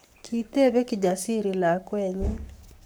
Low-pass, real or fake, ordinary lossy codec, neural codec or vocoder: none; fake; none; codec, 44.1 kHz, 7.8 kbps, Pupu-Codec